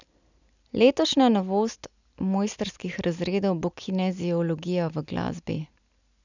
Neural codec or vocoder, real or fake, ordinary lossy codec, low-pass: none; real; none; 7.2 kHz